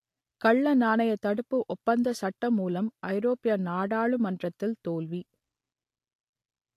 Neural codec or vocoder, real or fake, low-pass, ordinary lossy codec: none; real; 14.4 kHz; AAC, 64 kbps